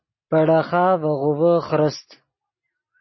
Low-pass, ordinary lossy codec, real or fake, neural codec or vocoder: 7.2 kHz; MP3, 24 kbps; real; none